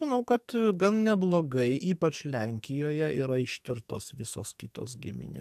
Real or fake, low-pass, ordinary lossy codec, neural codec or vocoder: fake; 14.4 kHz; AAC, 96 kbps; codec, 44.1 kHz, 2.6 kbps, SNAC